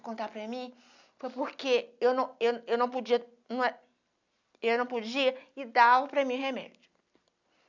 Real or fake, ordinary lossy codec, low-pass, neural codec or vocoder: real; none; 7.2 kHz; none